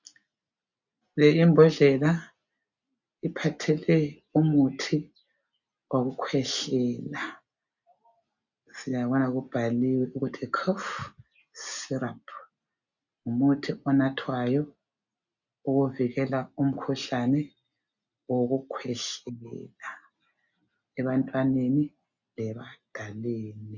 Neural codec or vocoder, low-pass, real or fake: none; 7.2 kHz; real